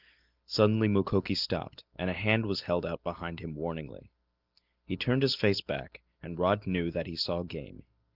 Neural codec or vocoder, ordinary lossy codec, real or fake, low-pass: none; Opus, 32 kbps; real; 5.4 kHz